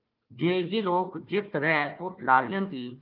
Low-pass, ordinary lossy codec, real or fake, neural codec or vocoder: 5.4 kHz; Opus, 24 kbps; fake; codec, 16 kHz, 1 kbps, FunCodec, trained on Chinese and English, 50 frames a second